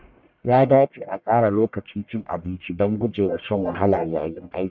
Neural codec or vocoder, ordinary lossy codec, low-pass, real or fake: codec, 44.1 kHz, 1.7 kbps, Pupu-Codec; none; 7.2 kHz; fake